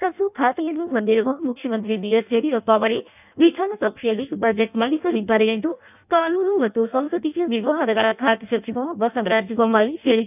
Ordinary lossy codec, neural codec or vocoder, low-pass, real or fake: none; codec, 16 kHz in and 24 kHz out, 0.6 kbps, FireRedTTS-2 codec; 3.6 kHz; fake